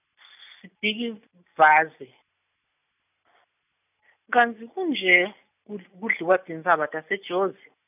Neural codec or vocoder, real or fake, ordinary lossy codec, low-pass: none; real; none; 3.6 kHz